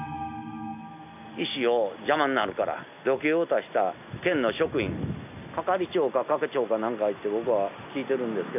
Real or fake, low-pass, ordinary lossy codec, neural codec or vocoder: real; 3.6 kHz; none; none